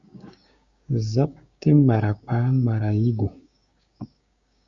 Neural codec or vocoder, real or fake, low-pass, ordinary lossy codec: codec, 16 kHz, 6 kbps, DAC; fake; 7.2 kHz; Opus, 64 kbps